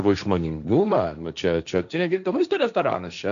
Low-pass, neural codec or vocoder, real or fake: 7.2 kHz; codec, 16 kHz, 1.1 kbps, Voila-Tokenizer; fake